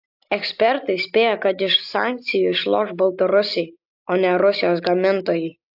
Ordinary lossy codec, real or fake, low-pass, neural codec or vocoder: AAC, 48 kbps; real; 5.4 kHz; none